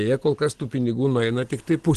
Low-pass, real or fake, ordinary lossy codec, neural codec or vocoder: 10.8 kHz; real; Opus, 16 kbps; none